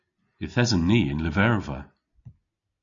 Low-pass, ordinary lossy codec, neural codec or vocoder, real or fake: 7.2 kHz; MP3, 64 kbps; none; real